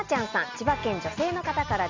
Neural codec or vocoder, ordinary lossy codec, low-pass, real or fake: none; MP3, 64 kbps; 7.2 kHz; real